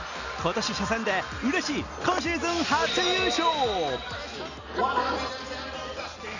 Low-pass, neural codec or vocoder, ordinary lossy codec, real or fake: 7.2 kHz; none; none; real